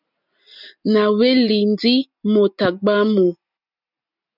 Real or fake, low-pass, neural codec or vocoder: real; 5.4 kHz; none